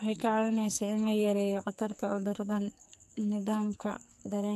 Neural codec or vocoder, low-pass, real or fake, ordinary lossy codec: codec, 44.1 kHz, 2.6 kbps, SNAC; 14.4 kHz; fake; none